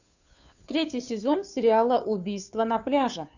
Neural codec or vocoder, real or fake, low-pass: codec, 16 kHz, 2 kbps, FunCodec, trained on Chinese and English, 25 frames a second; fake; 7.2 kHz